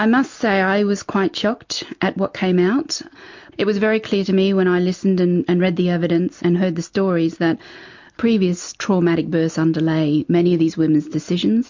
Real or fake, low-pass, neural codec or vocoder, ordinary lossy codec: real; 7.2 kHz; none; MP3, 48 kbps